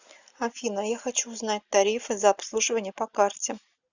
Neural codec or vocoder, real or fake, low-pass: none; real; 7.2 kHz